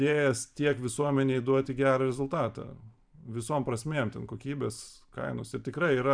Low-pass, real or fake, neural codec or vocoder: 9.9 kHz; fake; vocoder, 44.1 kHz, 128 mel bands every 512 samples, BigVGAN v2